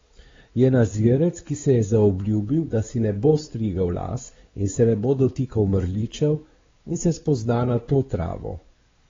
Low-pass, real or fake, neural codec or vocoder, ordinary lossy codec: 7.2 kHz; fake; codec, 16 kHz, 4 kbps, X-Codec, WavLM features, trained on Multilingual LibriSpeech; AAC, 24 kbps